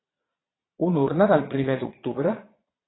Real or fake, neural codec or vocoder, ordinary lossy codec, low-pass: fake; vocoder, 22.05 kHz, 80 mel bands, Vocos; AAC, 16 kbps; 7.2 kHz